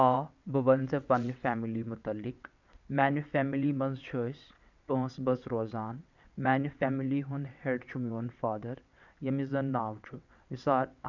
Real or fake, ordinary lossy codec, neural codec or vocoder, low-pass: fake; none; vocoder, 22.05 kHz, 80 mel bands, WaveNeXt; 7.2 kHz